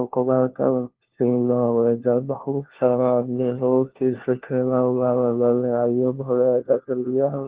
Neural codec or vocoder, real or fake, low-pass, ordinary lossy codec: codec, 16 kHz, 1 kbps, FunCodec, trained on LibriTTS, 50 frames a second; fake; 3.6 kHz; Opus, 16 kbps